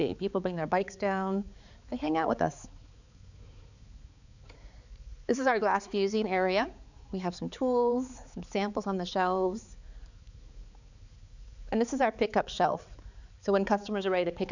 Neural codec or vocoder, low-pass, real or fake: codec, 16 kHz, 4 kbps, X-Codec, HuBERT features, trained on balanced general audio; 7.2 kHz; fake